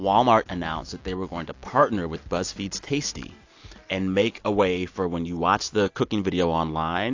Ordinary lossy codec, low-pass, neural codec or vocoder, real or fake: AAC, 48 kbps; 7.2 kHz; none; real